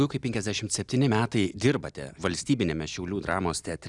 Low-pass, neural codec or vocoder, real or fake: 10.8 kHz; none; real